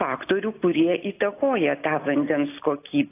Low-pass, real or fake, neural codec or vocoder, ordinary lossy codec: 3.6 kHz; real; none; AAC, 24 kbps